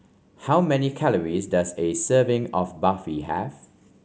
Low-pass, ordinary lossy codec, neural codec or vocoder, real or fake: none; none; none; real